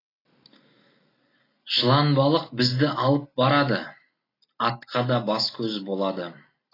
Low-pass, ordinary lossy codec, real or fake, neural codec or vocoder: 5.4 kHz; AAC, 24 kbps; real; none